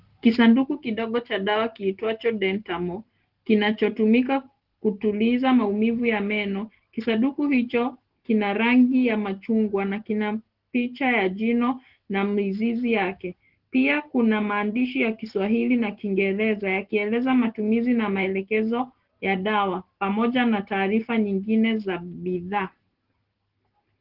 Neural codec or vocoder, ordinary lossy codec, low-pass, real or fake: none; Opus, 16 kbps; 5.4 kHz; real